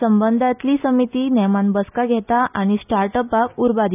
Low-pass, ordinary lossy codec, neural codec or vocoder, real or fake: 3.6 kHz; none; none; real